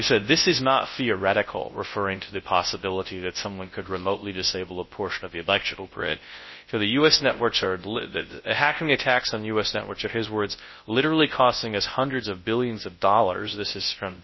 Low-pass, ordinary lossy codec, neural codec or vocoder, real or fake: 7.2 kHz; MP3, 24 kbps; codec, 24 kHz, 0.9 kbps, WavTokenizer, large speech release; fake